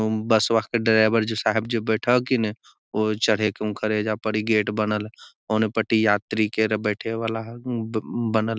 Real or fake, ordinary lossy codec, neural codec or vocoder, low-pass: real; none; none; none